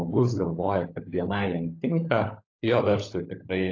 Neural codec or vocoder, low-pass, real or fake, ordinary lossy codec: codec, 16 kHz, 16 kbps, FunCodec, trained on LibriTTS, 50 frames a second; 7.2 kHz; fake; AAC, 32 kbps